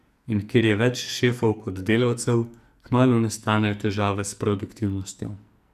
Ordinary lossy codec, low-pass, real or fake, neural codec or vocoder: none; 14.4 kHz; fake; codec, 44.1 kHz, 2.6 kbps, SNAC